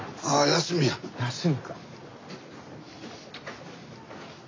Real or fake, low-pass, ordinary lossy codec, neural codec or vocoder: real; 7.2 kHz; AAC, 32 kbps; none